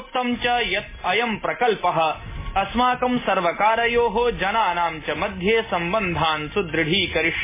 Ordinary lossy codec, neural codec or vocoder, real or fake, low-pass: MP3, 16 kbps; none; real; 3.6 kHz